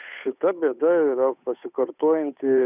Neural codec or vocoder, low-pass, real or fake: codec, 16 kHz, 8 kbps, FunCodec, trained on Chinese and English, 25 frames a second; 3.6 kHz; fake